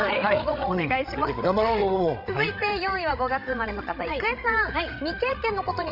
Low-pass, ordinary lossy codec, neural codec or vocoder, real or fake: 5.4 kHz; none; codec, 16 kHz, 16 kbps, FreqCodec, larger model; fake